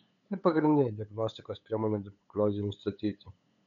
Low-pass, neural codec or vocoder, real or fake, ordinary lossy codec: 7.2 kHz; codec, 16 kHz, 8 kbps, FunCodec, trained on LibriTTS, 25 frames a second; fake; MP3, 96 kbps